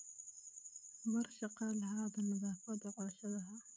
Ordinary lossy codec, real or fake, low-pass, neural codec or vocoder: none; fake; none; codec, 16 kHz, 16 kbps, FreqCodec, smaller model